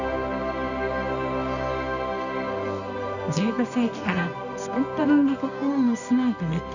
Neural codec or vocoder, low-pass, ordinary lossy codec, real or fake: codec, 24 kHz, 0.9 kbps, WavTokenizer, medium music audio release; 7.2 kHz; none; fake